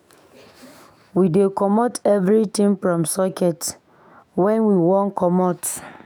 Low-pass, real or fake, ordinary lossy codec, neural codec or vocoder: none; fake; none; autoencoder, 48 kHz, 128 numbers a frame, DAC-VAE, trained on Japanese speech